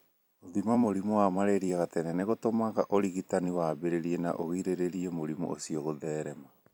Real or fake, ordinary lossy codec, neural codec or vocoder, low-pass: fake; none; vocoder, 44.1 kHz, 128 mel bands every 256 samples, BigVGAN v2; 19.8 kHz